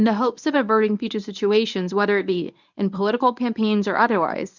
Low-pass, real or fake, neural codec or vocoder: 7.2 kHz; fake; codec, 24 kHz, 0.9 kbps, WavTokenizer, medium speech release version 1